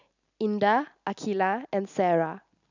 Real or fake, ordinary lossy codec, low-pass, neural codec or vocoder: real; none; 7.2 kHz; none